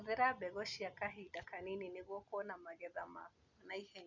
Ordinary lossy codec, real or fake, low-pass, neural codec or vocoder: none; real; 7.2 kHz; none